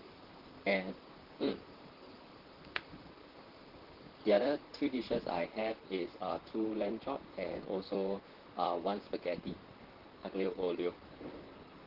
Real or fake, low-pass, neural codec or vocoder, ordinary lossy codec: fake; 5.4 kHz; vocoder, 44.1 kHz, 128 mel bands, Pupu-Vocoder; Opus, 16 kbps